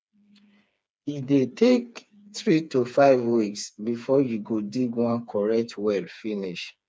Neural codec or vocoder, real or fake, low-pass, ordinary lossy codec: codec, 16 kHz, 4 kbps, FreqCodec, smaller model; fake; none; none